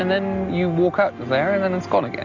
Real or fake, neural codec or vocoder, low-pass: real; none; 7.2 kHz